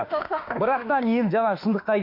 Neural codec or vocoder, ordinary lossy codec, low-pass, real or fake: autoencoder, 48 kHz, 32 numbers a frame, DAC-VAE, trained on Japanese speech; MP3, 32 kbps; 5.4 kHz; fake